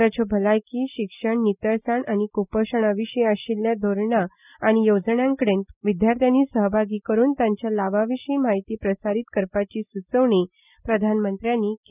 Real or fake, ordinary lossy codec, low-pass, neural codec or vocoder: real; none; 3.6 kHz; none